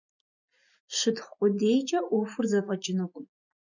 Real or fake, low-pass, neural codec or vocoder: real; 7.2 kHz; none